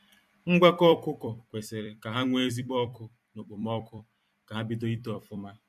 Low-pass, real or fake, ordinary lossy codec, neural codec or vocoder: 14.4 kHz; fake; MP3, 64 kbps; vocoder, 44.1 kHz, 128 mel bands every 256 samples, BigVGAN v2